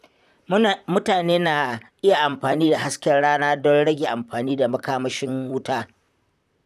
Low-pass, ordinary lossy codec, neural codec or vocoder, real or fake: 14.4 kHz; none; vocoder, 44.1 kHz, 128 mel bands, Pupu-Vocoder; fake